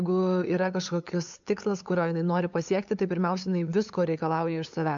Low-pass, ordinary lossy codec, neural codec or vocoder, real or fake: 7.2 kHz; AAC, 64 kbps; codec, 16 kHz, 8 kbps, FunCodec, trained on LibriTTS, 25 frames a second; fake